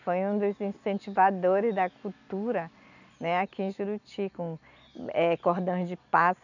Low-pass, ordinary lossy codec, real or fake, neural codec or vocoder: 7.2 kHz; none; real; none